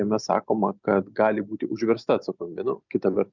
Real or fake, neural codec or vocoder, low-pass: real; none; 7.2 kHz